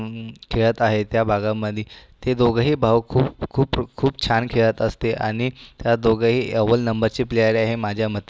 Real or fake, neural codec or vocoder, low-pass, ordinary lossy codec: real; none; none; none